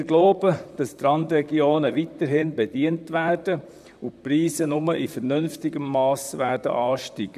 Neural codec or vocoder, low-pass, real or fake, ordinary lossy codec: vocoder, 44.1 kHz, 128 mel bands, Pupu-Vocoder; 14.4 kHz; fake; none